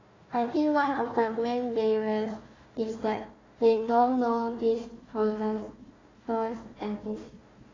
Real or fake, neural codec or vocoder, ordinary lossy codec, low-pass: fake; codec, 16 kHz, 1 kbps, FunCodec, trained on Chinese and English, 50 frames a second; AAC, 32 kbps; 7.2 kHz